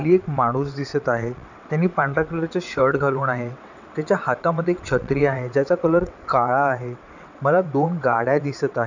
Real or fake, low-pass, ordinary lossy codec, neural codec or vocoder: fake; 7.2 kHz; none; vocoder, 22.05 kHz, 80 mel bands, WaveNeXt